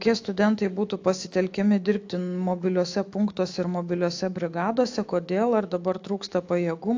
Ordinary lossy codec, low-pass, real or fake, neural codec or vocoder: AAC, 48 kbps; 7.2 kHz; fake; autoencoder, 48 kHz, 128 numbers a frame, DAC-VAE, trained on Japanese speech